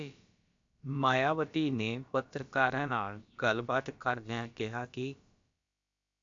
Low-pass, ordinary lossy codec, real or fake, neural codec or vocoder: 7.2 kHz; AAC, 64 kbps; fake; codec, 16 kHz, about 1 kbps, DyCAST, with the encoder's durations